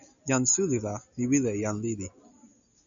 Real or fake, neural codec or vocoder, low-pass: real; none; 7.2 kHz